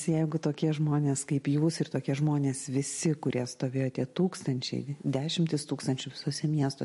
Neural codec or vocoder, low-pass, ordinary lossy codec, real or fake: none; 14.4 kHz; MP3, 48 kbps; real